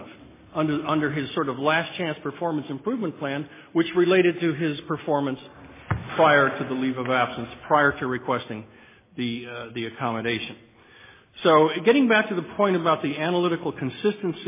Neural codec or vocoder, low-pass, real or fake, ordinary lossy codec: none; 3.6 kHz; real; MP3, 16 kbps